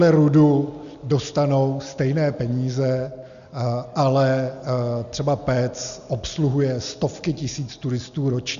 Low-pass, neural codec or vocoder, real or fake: 7.2 kHz; none; real